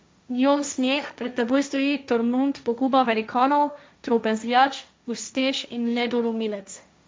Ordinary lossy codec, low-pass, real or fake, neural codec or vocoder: none; none; fake; codec, 16 kHz, 1.1 kbps, Voila-Tokenizer